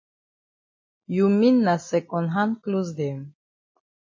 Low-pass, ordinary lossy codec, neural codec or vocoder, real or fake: 7.2 kHz; MP3, 32 kbps; none; real